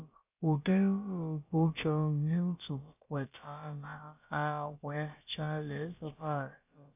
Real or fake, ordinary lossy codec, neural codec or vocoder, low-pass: fake; AAC, 32 kbps; codec, 16 kHz, about 1 kbps, DyCAST, with the encoder's durations; 3.6 kHz